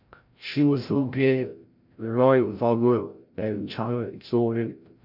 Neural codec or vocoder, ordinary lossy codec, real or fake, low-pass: codec, 16 kHz, 0.5 kbps, FreqCodec, larger model; none; fake; 5.4 kHz